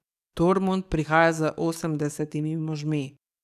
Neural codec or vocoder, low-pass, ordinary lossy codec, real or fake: codec, 44.1 kHz, 7.8 kbps, DAC; 14.4 kHz; none; fake